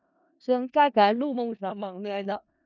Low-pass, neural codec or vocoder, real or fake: 7.2 kHz; codec, 16 kHz in and 24 kHz out, 0.4 kbps, LongCat-Audio-Codec, four codebook decoder; fake